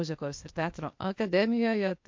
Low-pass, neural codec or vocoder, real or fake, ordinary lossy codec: 7.2 kHz; codec, 16 kHz, 0.8 kbps, ZipCodec; fake; MP3, 48 kbps